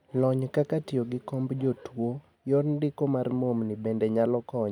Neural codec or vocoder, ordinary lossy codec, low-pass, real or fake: none; none; 19.8 kHz; real